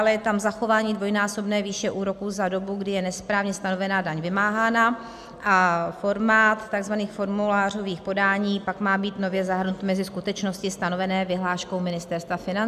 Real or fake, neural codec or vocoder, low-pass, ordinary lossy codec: real; none; 14.4 kHz; AAC, 96 kbps